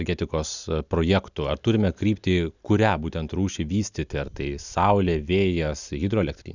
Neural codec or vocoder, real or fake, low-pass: none; real; 7.2 kHz